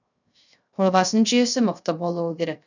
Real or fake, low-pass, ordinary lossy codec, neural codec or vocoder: fake; 7.2 kHz; none; codec, 16 kHz, 0.3 kbps, FocalCodec